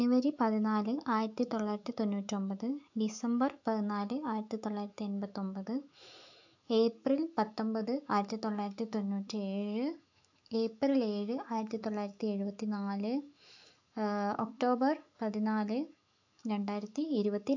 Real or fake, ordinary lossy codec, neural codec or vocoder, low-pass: fake; AAC, 48 kbps; autoencoder, 48 kHz, 128 numbers a frame, DAC-VAE, trained on Japanese speech; 7.2 kHz